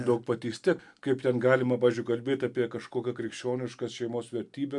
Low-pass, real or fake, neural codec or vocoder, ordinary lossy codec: 10.8 kHz; real; none; MP3, 64 kbps